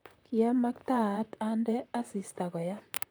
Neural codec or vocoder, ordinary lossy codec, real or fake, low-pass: vocoder, 44.1 kHz, 128 mel bands, Pupu-Vocoder; none; fake; none